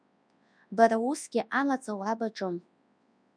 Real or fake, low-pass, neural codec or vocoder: fake; 9.9 kHz; codec, 24 kHz, 0.9 kbps, WavTokenizer, large speech release